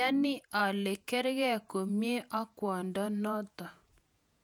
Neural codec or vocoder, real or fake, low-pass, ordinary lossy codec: vocoder, 48 kHz, 128 mel bands, Vocos; fake; 19.8 kHz; none